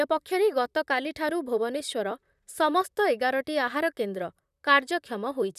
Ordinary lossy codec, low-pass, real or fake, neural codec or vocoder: none; 14.4 kHz; fake; vocoder, 44.1 kHz, 128 mel bands, Pupu-Vocoder